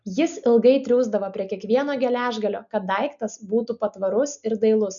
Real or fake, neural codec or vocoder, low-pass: real; none; 7.2 kHz